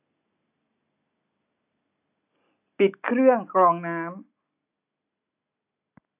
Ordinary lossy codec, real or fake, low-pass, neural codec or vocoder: AAC, 24 kbps; real; 3.6 kHz; none